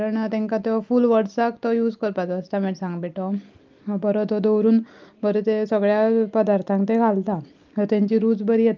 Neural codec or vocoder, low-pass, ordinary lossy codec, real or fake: none; 7.2 kHz; Opus, 32 kbps; real